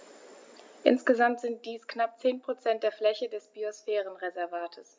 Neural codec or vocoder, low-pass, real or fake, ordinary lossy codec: none; none; real; none